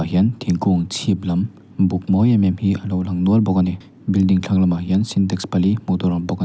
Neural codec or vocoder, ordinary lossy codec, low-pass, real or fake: none; none; none; real